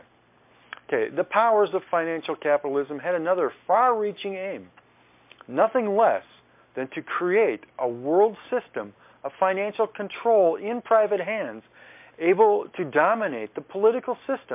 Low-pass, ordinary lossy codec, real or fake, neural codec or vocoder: 3.6 kHz; MP3, 32 kbps; real; none